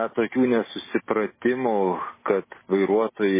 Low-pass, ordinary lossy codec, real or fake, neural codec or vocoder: 3.6 kHz; MP3, 16 kbps; real; none